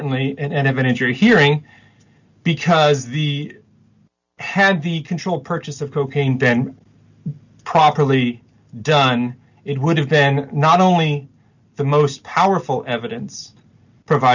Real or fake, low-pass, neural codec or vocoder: real; 7.2 kHz; none